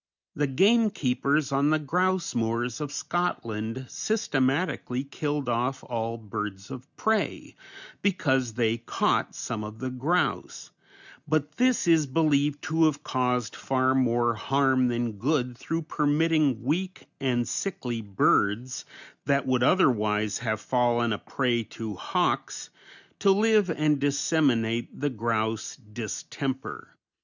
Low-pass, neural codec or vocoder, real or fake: 7.2 kHz; none; real